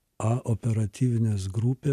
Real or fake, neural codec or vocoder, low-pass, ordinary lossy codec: real; none; 14.4 kHz; MP3, 96 kbps